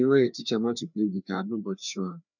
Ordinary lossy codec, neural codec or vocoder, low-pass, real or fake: none; codec, 16 kHz, 2 kbps, FreqCodec, larger model; 7.2 kHz; fake